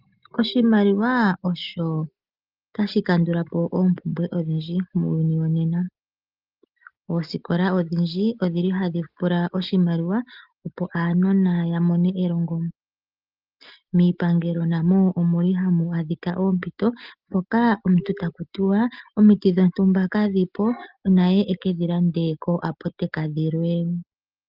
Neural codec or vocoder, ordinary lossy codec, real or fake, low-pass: none; Opus, 32 kbps; real; 5.4 kHz